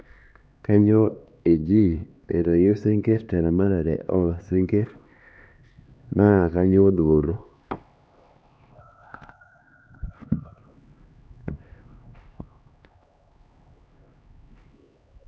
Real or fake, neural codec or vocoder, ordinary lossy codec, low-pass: fake; codec, 16 kHz, 2 kbps, X-Codec, HuBERT features, trained on LibriSpeech; none; none